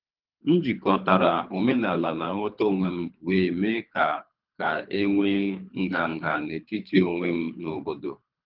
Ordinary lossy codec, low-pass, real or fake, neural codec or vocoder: Opus, 24 kbps; 5.4 kHz; fake; codec, 24 kHz, 3 kbps, HILCodec